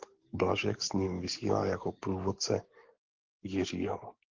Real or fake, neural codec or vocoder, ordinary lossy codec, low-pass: fake; codec, 44.1 kHz, 7.8 kbps, DAC; Opus, 16 kbps; 7.2 kHz